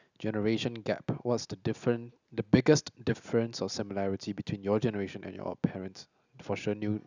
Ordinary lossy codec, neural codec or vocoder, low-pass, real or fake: none; none; 7.2 kHz; real